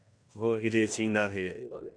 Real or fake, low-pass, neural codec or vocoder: fake; 9.9 kHz; codec, 16 kHz in and 24 kHz out, 0.9 kbps, LongCat-Audio-Codec, fine tuned four codebook decoder